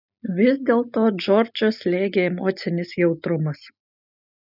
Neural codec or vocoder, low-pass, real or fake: none; 5.4 kHz; real